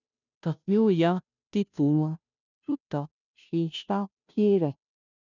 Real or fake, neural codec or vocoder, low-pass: fake; codec, 16 kHz, 0.5 kbps, FunCodec, trained on Chinese and English, 25 frames a second; 7.2 kHz